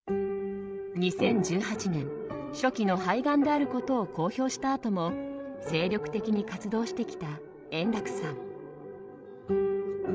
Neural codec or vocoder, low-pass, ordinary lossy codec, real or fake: codec, 16 kHz, 16 kbps, FreqCodec, larger model; none; none; fake